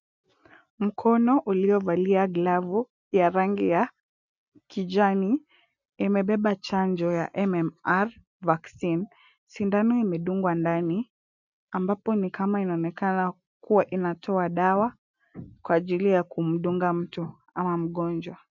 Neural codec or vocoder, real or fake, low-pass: none; real; 7.2 kHz